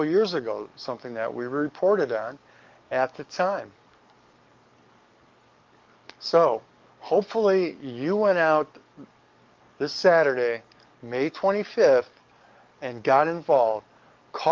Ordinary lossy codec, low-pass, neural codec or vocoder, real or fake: Opus, 32 kbps; 7.2 kHz; codec, 44.1 kHz, 7.8 kbps, DAC; fake